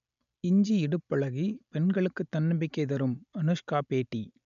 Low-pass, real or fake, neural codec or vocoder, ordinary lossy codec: 7.2 kHz; real; none; none